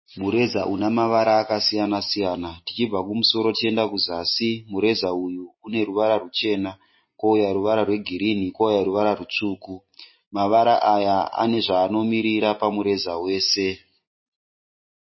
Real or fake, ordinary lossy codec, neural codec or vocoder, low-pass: real; MP3, 24 kbps; none; 7.2 kHz